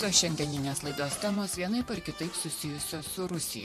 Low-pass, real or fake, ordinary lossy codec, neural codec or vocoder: 14.4 kHz; fake; MP3, 64 kbps; vocoder, 44.1 kHz, 128 mel bands, Pupu-Vocoder